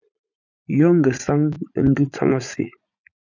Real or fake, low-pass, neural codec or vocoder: fake; 7.2 kHz; vocoder, 44.1 kHz, 80 mel bands, Vocos